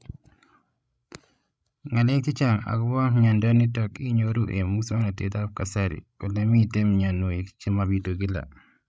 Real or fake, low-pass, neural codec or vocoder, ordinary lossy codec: fake; none; codec, 16 kHz, 16 kbps, FreqCodec, larger model; none